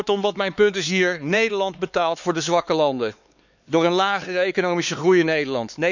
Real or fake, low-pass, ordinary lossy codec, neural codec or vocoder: fake; 7.2 kHz; none; codec, 16 kHz, 4 kbps, X-Codec, HuBERT features, trained on LibriSpeech